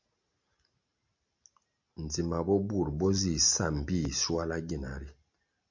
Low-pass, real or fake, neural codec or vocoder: 7.2 kHz; real; none